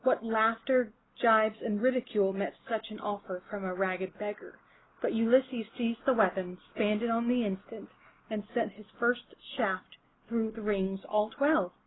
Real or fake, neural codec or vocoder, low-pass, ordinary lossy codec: real; none; 7.2 kHz; AAC, 16 kbps